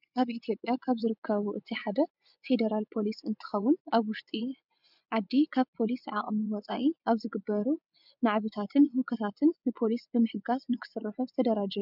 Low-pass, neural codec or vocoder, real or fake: 5.4 kHz; vocoder, 24 kHz, 100 mel bands, Vocos; fake